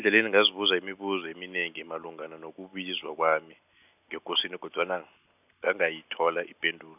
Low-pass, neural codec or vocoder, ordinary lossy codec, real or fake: 3.6 kHz; none; none; real